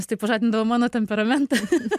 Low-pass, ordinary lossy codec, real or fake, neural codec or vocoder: 14.4 kHz; MP3, 96 kbps; real; none